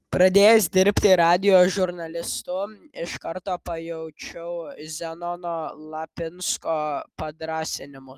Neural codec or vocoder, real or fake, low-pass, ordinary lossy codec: none; real; 14.4 kHz; Opus, 32 kbps